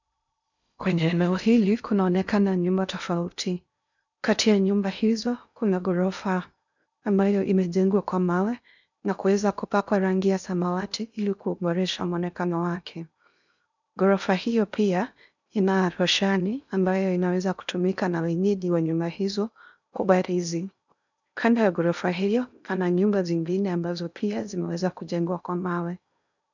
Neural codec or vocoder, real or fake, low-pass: codec, 16 kHz in and 24 kHz out, 0.6 kbps, FocalCodec, streaming, 2048 codes; fake; 7.2 kHz